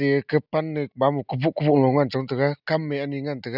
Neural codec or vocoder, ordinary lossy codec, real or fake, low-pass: none; none; real; 5.4 kHz